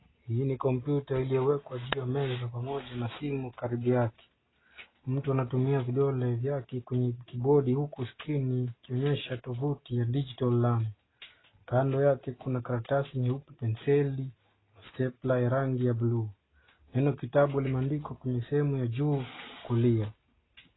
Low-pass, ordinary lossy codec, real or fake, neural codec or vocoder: 7.2 kHz; AAC, 16 kbps; real; none